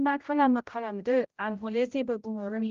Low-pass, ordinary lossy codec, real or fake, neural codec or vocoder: 7.2 kHz; Opus, 32 kbps; fake; codec, 16 kHz, 0.5 kbps, X-Codec, HuBERT features, trained on general audio